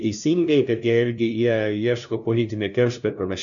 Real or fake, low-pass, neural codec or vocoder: fake; 7.2 kHz; codec, 16 kHz, 0.5 kbps, FunCodec, trained on LibriTTS, 25 frames a second